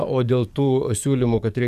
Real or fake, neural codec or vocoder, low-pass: fake; codec, 44.1 kHz, 7.8 kbps, DAC; 14.4 kHz